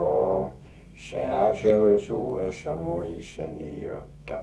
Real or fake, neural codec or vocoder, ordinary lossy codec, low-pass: fake; codec, 24 kHz, 0.9 kbps, WavTokenizer, medium music audio release; none; none